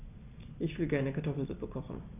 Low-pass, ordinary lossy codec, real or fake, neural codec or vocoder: 3.6 kHz; none; real; none